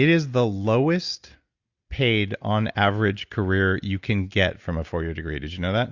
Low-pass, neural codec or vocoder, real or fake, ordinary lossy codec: 7.2 kHz; none; real; Opus, 64 kbps